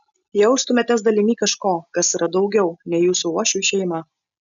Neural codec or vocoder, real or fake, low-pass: none; real; 7.2 kHz